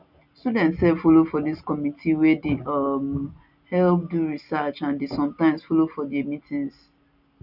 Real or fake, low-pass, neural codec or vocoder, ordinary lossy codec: real; 5.4 kHz; none; none